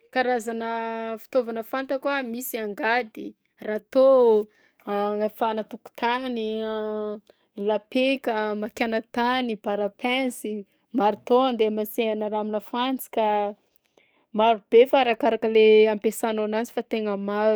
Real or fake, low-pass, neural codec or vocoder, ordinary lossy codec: fake; none; codec, 44.1 kHz, 7.8 kbps, DAC; none